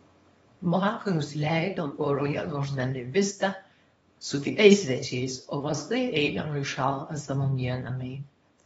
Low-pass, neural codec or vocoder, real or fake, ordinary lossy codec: 10.8 kHz; codec, 24 kHz, 0.9 kbps, WavTokenizer, small release; fake; AAC, 24 kbps